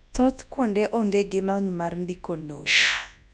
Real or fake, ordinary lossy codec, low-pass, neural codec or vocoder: fake; none; 10.8 kHz; codec, 24 kHz, 0.9 kbps, WavTokenizer, large speech release